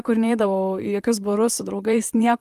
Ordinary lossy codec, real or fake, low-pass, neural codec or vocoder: Opus, 32 kbps; fake; 14.4 kHz; vocoder, 44.1 kHz, 128 mel bands, Pupu-Vocoder